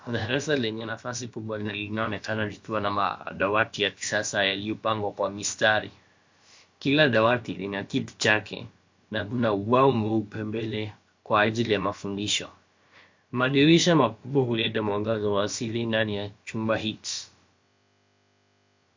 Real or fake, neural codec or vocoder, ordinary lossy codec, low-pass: fake; codec, 16 kHz, about 1 kbps, DyCAST, with the encoder's durations; MP3, 48 kbps; 7.2 kHz